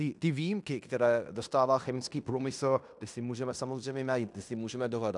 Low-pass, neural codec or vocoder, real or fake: 10.8 kHz; codec, 16 kHz in and 24 kHz out, 0.9 kbps, LongCat-Audio-Codec, fine tuned four codebook decoder; fake